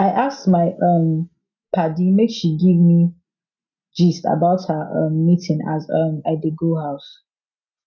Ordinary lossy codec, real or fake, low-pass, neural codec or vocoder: none; real; 7.2 kHz; none